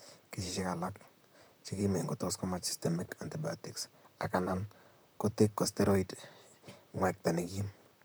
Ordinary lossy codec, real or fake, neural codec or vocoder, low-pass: none; fake; vocoder, 44.1 kHz, 128 mel bands, Pupu-Vocoder; none